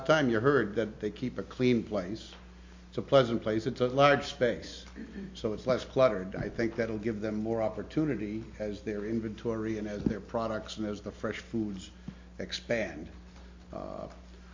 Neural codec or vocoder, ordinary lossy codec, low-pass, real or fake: none; MP3, 48 kbps; 7.2 kHz; real